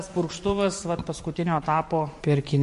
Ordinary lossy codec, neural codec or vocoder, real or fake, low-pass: MP3, 48 kbps; vocoder, 44.1 kHz, 128 mel bands every 512 samples, BigVGAN v2; fake; 14.4 kHz